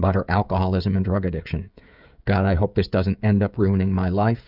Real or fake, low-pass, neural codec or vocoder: fake; 5.4 kHz; codec, 16 kHz, 16 kbps, FreqCodec, smaller model